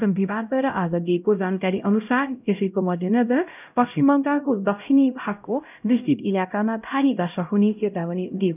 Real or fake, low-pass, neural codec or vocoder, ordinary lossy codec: fake; 3.6 kHz; codec, 16 kHz, 0.5 kbps, X-Codec, HuBERT features, trained on LibriSpeech; none